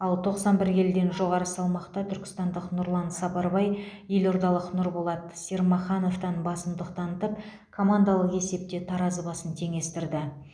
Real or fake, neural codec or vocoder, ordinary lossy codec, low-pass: real; none; none; 9.9 kHz